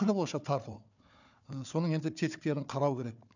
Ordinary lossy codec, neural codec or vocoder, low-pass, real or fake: none; codec, 16 kHz, 4 kbps, FunCodec, trained on Chinese and English, 50 frames a second; 7.2 kHz; fake